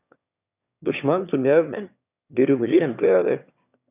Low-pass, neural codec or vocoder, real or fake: 3.6 kHz; autoencoder, 22.05 kHz, a latent of 192 numbers a frame, VITS, trained on one speaker; fake